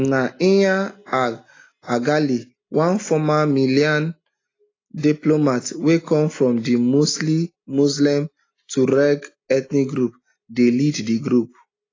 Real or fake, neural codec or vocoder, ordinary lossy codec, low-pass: real; none; AAC, 32 kbps; 7.2 kHz